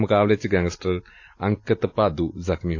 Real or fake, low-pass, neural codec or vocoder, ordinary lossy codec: real; 7.2 kHz; none; AAC, 48 kbps